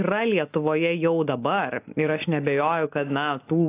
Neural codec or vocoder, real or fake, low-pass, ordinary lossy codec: none; real; 3.6 kHz; AAC, 24 kbps